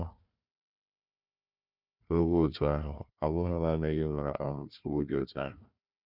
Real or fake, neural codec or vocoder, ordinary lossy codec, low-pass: fake; codec, 16 kHz, 1 kbps, FunCodec, trained on Chinese and English, 50 frames a second; none; 5.4 kHz